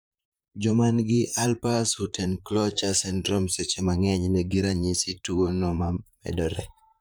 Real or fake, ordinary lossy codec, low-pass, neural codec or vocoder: fake; none; none; vocoder, 44.1 kHz, 128 mel bands, Pupu-Vocoder